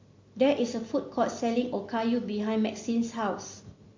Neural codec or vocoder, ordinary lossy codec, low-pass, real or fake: none; MP3, 48 kbps; 7.2 kHz; real